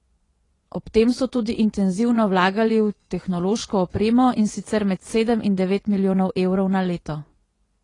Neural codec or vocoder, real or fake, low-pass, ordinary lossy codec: vocoder, 24 kHz, 100 mel bands, Vocos; fake; 10.8 kHz; AAC, 32 kbps